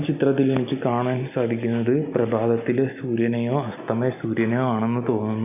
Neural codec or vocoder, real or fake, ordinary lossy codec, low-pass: none; real; none; 3.6 kHz